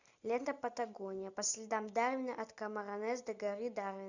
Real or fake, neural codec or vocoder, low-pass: real; none; 7.2 kHz